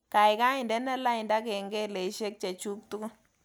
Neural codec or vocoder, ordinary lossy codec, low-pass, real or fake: none; none; none; real